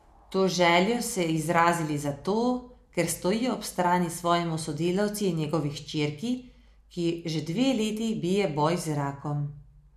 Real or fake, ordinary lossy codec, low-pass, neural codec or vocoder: real; none; 14.4 kHz; none